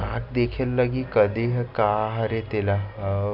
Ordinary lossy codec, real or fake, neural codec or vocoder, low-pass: MP3, 48 kbps; real; none; 5.4 kHz